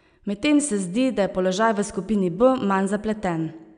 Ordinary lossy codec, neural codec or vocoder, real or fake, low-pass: none; none; real; 9.9 kHz